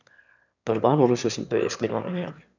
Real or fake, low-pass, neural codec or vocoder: fake; 7.2 kHz; autoencoder, 22.05 kHz, a latent of 192 numbers a frame, VITS, trained on one speaker